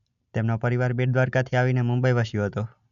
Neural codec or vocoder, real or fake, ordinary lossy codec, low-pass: none; real; none; 7.2 kHz